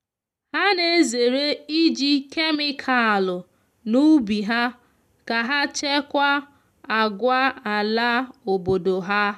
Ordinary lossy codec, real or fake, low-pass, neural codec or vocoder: none; real; 14.4 kHz; none